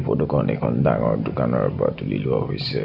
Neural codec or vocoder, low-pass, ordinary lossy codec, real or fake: none; 5.4 kHz; none; real